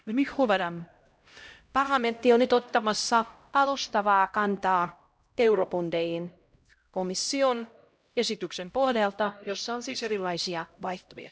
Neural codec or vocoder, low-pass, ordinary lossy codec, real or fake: codec, 16 kHz, 0.5 kbps, X-Codec, HuBERT features, trained on LibriSpeech; none; none; fake